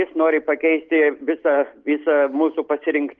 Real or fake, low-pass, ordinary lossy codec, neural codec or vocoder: real; 7.2 kHz; Opus, 24 kbps; none